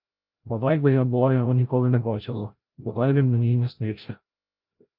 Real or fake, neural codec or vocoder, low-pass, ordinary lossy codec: fake; codec, 16 kHz, 0.5 kbps, FreqCodec, larger model; 5.4 kHz; Opus, 24 kbps